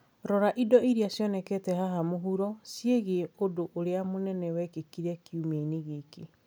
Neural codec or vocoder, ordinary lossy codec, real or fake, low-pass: none; none; real; none